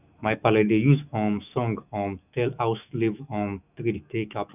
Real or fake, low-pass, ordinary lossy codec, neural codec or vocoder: fake; 3.6 kHz; none; autoencoder, 48 kHz, 128 numbers a frame, DAC-VAE, trained on Japanese speech